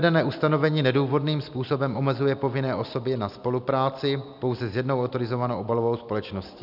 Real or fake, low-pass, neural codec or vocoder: real; 5.4 kHz; none